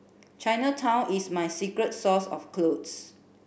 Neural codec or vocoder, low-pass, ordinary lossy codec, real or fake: none; none; none; real